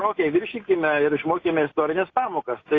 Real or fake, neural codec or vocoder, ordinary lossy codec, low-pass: real; none; AAC, 32 kbps; 7.2 kHz